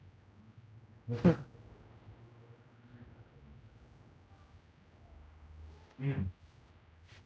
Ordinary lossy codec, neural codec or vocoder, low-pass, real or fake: none; codec, 16 kHz, 0.5 kbps, X-Codec, HuBERT features, trained on general audio; none; fake